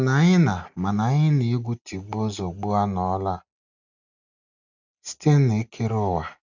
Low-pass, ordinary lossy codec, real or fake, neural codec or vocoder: 7.2 kHz; none; real; none